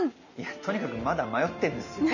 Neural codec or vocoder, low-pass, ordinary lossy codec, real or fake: none; 7.2 kHz; none; real